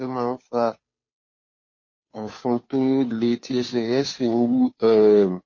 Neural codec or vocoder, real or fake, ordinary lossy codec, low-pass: codec, 16 kHz, 2 kbps, FunCodec, trained on Chinese and English, 25 frames a second; fake; MP3, 32 kbps; 7.2 kHz